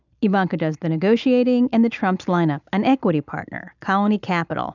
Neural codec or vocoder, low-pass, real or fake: none; 7.2 kHz; real